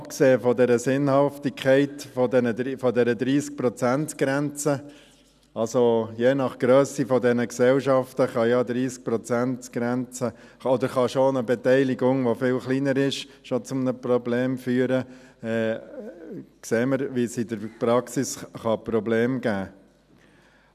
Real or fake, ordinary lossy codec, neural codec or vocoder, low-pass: real; none; none; 14.4 kHz